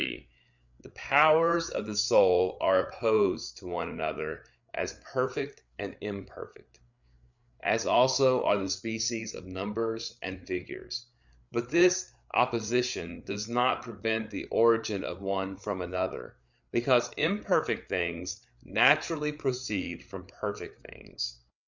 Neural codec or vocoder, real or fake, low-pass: codec, 16 kHz, 8 kbps, FreqCodec, larger model; fake; 7.2 kHz